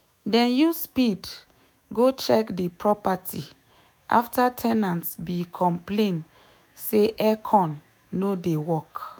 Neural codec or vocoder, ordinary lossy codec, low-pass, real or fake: autoencoder, 48 kHz, 128 numbers a frame, DAC-VAE, trained on Japanese speech; none; none; fake